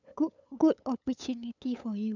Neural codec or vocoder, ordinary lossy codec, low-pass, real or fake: codec, 16 kHz, 8 kbps, FunCodec, trained on LibriTTS, 25 frames a second; none; 7.2 kHz; fake